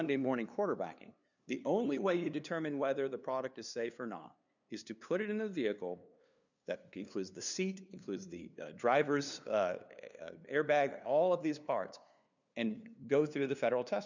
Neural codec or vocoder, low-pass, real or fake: codec, 16 kHz, 2 kbps, FunCodec, trained on LibriTTS, 25 frames a second; 7.2 kHz; fake